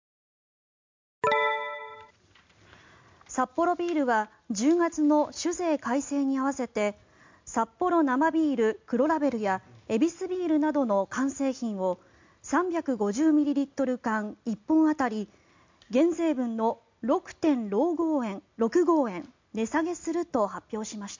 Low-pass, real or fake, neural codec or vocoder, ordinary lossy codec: 7.2 kHz; real; none; MP3, 64 kbps